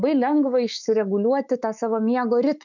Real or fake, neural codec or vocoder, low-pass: fake; autoencoder, 48 kHz, 128 numbers a frame, DAC-VAE, trained on Japanese speech; 7.2 kHz